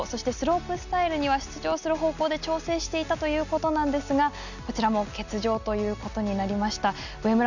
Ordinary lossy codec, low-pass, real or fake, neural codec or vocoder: none; 7.2 kHz; real; none